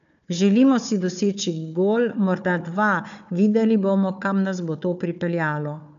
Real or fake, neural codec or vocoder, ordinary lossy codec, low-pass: fake; codec, 16 kHz, 4 kbps, FunCodec, trained on Chinese and English, 50 frames a second; none; 7.2 kHz